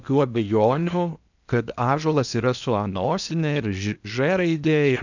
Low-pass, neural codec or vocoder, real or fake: 7.2 kHz; codec, 16 kHz in and 24 kHz out, 0.8 kbps, FocalCodec, streaming, 65536 codes; fake